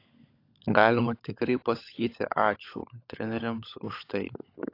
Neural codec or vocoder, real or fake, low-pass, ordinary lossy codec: codec, 16 kHz, 16 kbps, FunCodec, trained on LibriTTS, 50 frames a second; fake; 5.4 kHz; AAC, 32 kbps